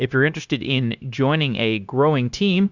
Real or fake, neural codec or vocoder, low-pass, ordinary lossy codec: fake; codec, 16 kHz, 0.9 kbps, LongCat-Audio-Codec; 7.2 kHz; Opus, 64 kbps